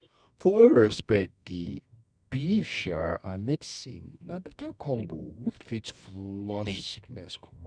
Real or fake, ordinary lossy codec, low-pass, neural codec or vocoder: fake; none; 9.9 kHz; codec, 24 kHz, 0.9 kbps, WavTokenizer, medium music audio release